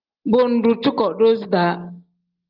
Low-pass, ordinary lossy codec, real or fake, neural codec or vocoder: 5.4 kHz; Opus, 32 kbps; real; none